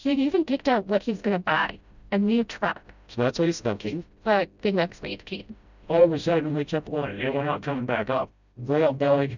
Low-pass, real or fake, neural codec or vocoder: 7.2 kHz; fake; codec, 16 kHz, 0.5 kbps, FreqCodec, smaller model